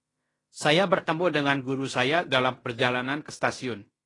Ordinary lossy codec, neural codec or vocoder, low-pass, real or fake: AAC, 32 kbps; codec, 16 kHz in and 24 kHz out, 0.9 kbps, LongCat-Audio-Codec, fine tuned four codebook decoder; 10.8 kHz; fake